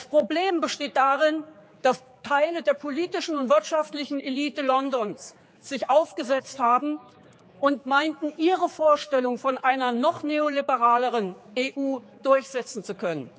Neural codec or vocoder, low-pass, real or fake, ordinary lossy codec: codec, 16 kHz, 4 kbps, X-Codec, HuBERT features, trained on general audio; none; fake; none